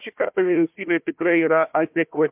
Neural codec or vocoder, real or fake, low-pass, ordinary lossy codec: codec, 16 kHz, 1 kbps, FunCodec, trained on Chinese and English, 50 frames a second; fake; 3.6 kHz; MP3, 32 kbps